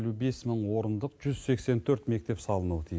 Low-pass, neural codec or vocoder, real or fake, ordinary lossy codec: none; none; real; none